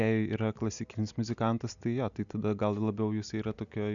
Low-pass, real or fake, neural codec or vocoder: 7.2 kHz; real; none